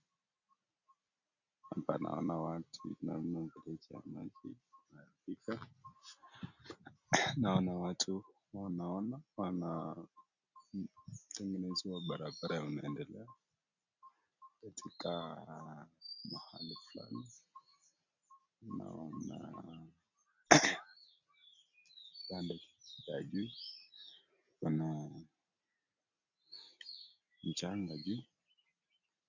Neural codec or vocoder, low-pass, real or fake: none; 7.2 kHz; real